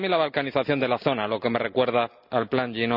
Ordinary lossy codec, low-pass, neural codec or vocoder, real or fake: none; 5.4 kHz; none; real